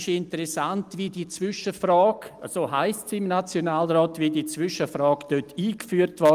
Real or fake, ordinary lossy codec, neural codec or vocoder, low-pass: real; Opus, 32 kbps; none; 14.4 kHz